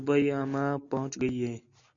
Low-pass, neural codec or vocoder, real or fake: 7.2 kHz; none; real